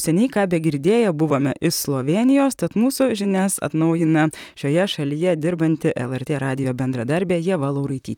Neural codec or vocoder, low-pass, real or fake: vocoder, 44.1 kHz, 128 mel bands, Pupu-Vocoder; 19.8 kHz; fake